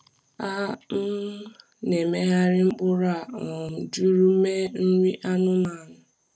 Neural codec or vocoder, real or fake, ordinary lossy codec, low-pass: none; real; none; none